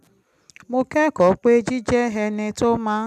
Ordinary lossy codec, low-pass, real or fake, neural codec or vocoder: none; 14.4 kHz; real; none